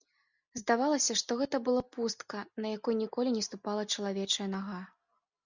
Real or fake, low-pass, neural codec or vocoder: real; 7.2 kHz; none